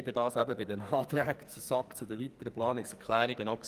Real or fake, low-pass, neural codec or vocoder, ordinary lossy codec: fake; 14.4 kHz; codec, 44.1 kHz, 2.6 kbps, SNAC; Opus, 32 kbps